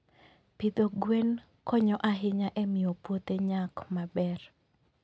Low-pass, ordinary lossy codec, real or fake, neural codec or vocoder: none; none; real; none